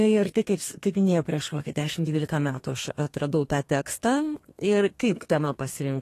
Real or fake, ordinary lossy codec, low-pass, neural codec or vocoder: fake; AAC, 48 kbps; 14.4 kHz; codec, 32 kHz, 1.9 kbps, SNAC